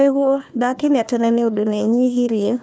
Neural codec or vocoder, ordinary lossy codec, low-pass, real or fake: codec, 16 kHz, 1 kbps, FunCodec, trained on Chinese and English, 50 frames a second; none; none; fake